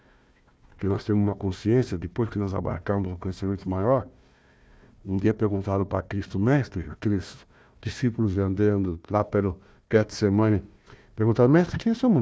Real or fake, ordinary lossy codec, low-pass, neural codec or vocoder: fake; none; none; codec, 16 kHz, 1 kbps, FunCodec, trained on Chinese and English, 50 frames a second